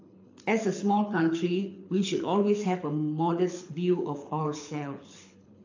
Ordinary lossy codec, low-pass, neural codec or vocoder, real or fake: AAC, 48 kbps; 7.2 kHz; codec, 24 kHz, 6 kbps, HILCodec; fake